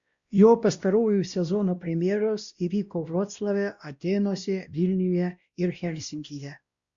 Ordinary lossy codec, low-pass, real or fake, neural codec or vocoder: Opus, 64 kbps; 7.2 kHz; fake; codec, 16 kHz, 1 kbps, X-Codec, WavLM features, trained on Multilingual LibriSpeech